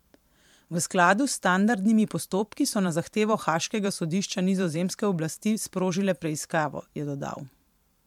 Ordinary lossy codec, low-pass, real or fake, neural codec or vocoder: MP3, 96 kbps; 19.8 kHz; fake; vocoder, 44.1 kHz, 128 mel bands every 512 samples, BigVGAN v2